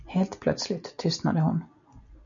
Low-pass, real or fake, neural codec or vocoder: 7.2 kHz; real; none